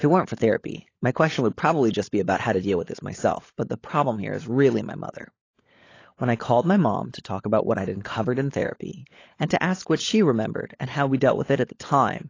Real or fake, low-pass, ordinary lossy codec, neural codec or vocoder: fake; 7.2 kHz; AAC, 32 kbps; codec, 16 kHz, 16 kbps, FunCodec, trained on LibriTTS, 50 frames a second